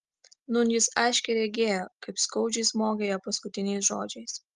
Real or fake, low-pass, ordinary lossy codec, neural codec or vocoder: real; 10.8 kHz; Opus, 24 kbps; none